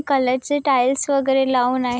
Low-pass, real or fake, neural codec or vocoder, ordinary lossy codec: none; real; none; none